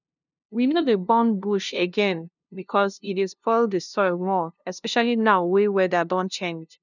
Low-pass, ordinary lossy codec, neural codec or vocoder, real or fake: 7.2 kHz; none; codec, 16 kHz, 0.5 kbps, FunCodec, trained on LibriTTS, 25 frames a second; fake